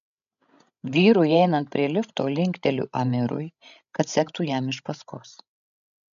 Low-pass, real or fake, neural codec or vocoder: 7.2 kHz; fake; codec, 16 kHz, 16 kbps, FreqCodec, larger model